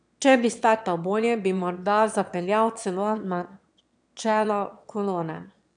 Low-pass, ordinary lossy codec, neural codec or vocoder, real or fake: 9.9 kHz; none; autoencoder, 22.05 kHz, a latent of 192 numbers a frame, VITS, trained on one speaker; fake